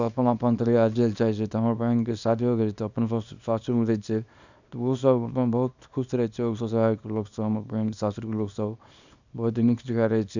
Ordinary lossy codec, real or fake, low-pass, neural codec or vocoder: none; fake; 7.2 kHz; codec, 24 kHz, 0.9 kbps, WavTokenizer, small release